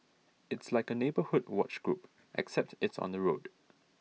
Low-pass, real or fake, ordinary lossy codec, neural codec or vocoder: none; real; none; none